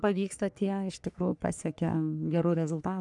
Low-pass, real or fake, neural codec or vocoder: 10.8 kHz; fake; codec, 44.1 kHz, 3.4 kbps, Pupu-Codec